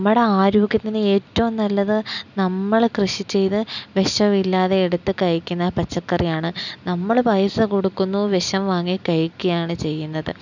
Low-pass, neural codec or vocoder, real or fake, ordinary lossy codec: 7.2 kHz; none; real; none